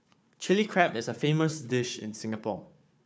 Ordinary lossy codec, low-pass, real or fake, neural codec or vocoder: none; none; fake; codec, 16 kHz, 4 kbps, FunCodec, trained on Chinese and English, 50 frames a second